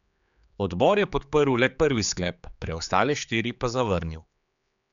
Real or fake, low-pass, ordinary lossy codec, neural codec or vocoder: fake; 7.2 kHz; MP3, 96 kbps; codec, 16 kHz, 4 kbps, X-Codec, HuBERT features, trained on general audio